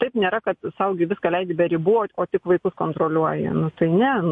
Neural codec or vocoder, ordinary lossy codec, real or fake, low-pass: none; MP3, 48 kbps; real; 10.8 kHz